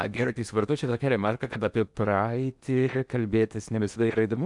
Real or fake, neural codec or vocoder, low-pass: fake; codec, 16 kHz in and 24 kHz out, 0.8 kbps, FocalCodec, streaming, 65536 codes; 10.8 kHz